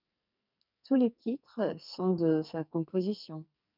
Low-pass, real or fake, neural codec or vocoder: 5.4 kHz; fake; codec, 44.1 kHz, 2.6 kbps, SNAC